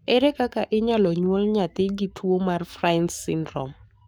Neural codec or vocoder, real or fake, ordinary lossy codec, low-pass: codec, 44.1 kHz, 7.8 kbps, Pupu-Codec; fake; none; none